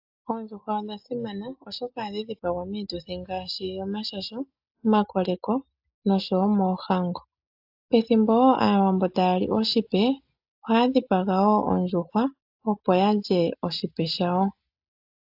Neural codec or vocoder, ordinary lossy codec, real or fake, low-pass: none; AAC, 48 kbps; real; 5.4 kHz